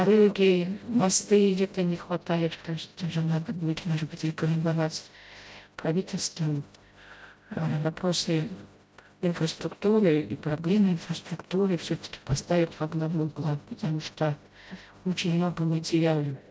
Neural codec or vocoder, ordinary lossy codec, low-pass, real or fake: codec, 16 kHz, 0.5 kbps, FreqCodec, smaller model; none; none; fake